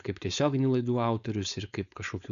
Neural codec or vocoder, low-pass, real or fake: codec, 16 kHz, 4.8 kbps, FACodec; 7.2 kHz; fake